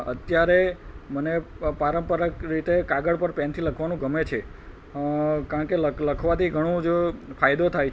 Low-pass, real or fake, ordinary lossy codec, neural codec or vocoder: none; real; none; none